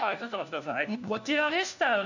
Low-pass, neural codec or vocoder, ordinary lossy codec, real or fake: 7.2 kHz; codec, 16 kHz, 1 kbps, FunCodec, trained on LibriTTS, 50 frames a second; none; fake